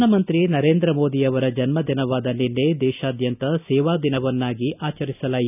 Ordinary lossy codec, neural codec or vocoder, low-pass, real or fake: none; none; 3.6 kHz; real